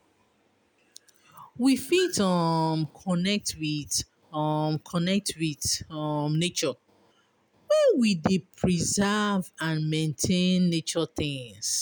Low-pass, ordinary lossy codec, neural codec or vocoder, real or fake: none; none; none; real